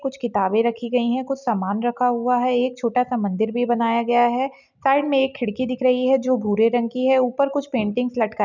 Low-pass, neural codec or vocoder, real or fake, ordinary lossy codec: 7.2 kHz; none; real; none